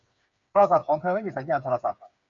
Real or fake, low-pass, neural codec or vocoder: fake; 7.2 kHz; codec, 16 kHz, 8 kbps, FreqCodec, smaller model